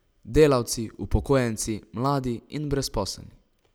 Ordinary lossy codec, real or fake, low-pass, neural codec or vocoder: none; real; none; none